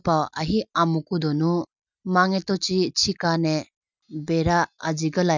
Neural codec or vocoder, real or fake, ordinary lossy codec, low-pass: none; real; none; 7.2 kHz